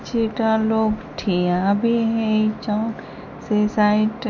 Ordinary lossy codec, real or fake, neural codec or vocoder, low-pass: none; real; none; 7.2 kHz